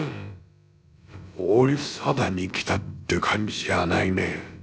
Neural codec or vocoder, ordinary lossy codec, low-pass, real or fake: codec, 16 kHz, about 1 kbps, DyCAST, with the encoder's durations; none; none; fake